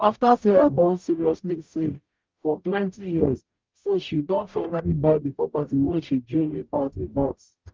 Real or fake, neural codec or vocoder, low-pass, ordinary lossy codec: fake; codec, 44.1 kHz, 0.9 kbps, DAC; 7.2 kHz; Opus, 32 kbps